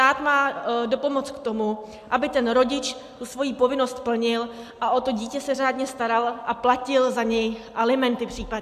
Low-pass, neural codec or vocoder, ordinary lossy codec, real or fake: 14.4 kHz; none; AAC, 96 kbps; real